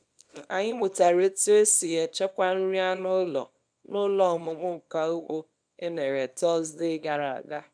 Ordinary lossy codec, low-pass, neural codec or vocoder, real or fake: none; 9.9 kHz; codec, 24 kHz, 0.9 kbps, WavTokenizer, small release; fake